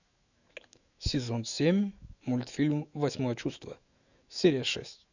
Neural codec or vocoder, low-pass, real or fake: autoencoder, 48 kHz, 128 numbers a frame, DAC-VAE, trained on Japanese speech; 7.2 kHz; fake